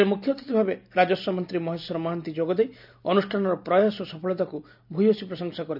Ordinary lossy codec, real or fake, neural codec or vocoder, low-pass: none; real; none; 5.4 kHz